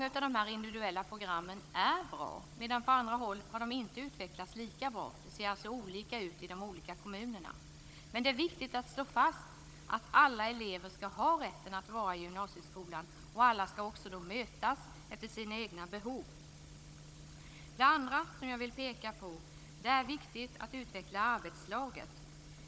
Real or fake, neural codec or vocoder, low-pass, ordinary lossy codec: fake; codec, 16 kHz, 16 kbps, FunCodec, trained on Chinese and English, 50 frames a second; none; none